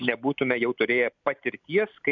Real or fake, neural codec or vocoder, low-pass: real; none; 7.2 kHz